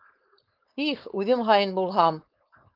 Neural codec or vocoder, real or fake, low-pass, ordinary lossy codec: codec, 16 kHz, 4.8 kbps, FACodec; fake; 5.4 kHz; Opus, 24 kbps